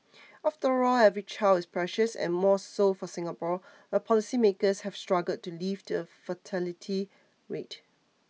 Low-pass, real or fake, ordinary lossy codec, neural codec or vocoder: none; real; none; none